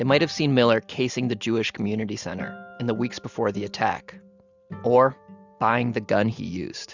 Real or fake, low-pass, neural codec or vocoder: real; 7.2 kHz; none